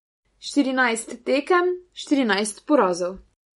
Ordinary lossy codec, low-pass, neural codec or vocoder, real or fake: MP3, 48 kbps; 19.8 kHz; none; real